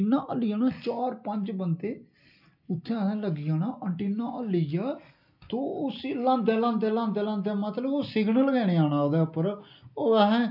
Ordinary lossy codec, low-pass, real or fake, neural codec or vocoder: none; 5.4 kHz; real; none